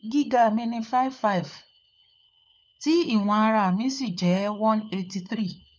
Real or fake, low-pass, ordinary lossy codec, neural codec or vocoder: fake; none; none; codec, 16 kHz, 4 kbps, FunCodec, trained on LibriTTS, 50 frames a second